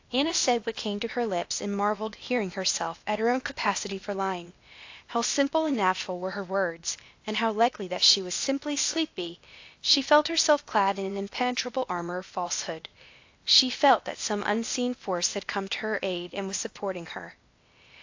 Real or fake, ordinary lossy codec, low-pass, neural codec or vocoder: fake; AAC, 48 kbps; 7.2 kHz; codec, 16 kHz, 0.8 kbps, ZipCodec